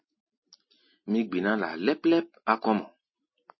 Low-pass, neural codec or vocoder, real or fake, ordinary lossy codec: 7.2 kHz; none; real; MP3, 24 kbps